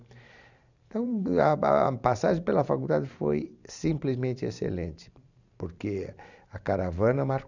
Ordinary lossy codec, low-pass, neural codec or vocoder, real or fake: none; 7.2 kHz; vocoder, 44.1 kHz, 128 mel bands every 512 samples, BigVGAN v2; fake